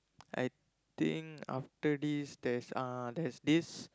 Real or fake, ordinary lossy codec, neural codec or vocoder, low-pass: real; none; none; none